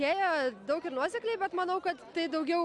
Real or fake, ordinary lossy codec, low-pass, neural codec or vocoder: real; MP3, 96 kbps; 10.8 kHz; none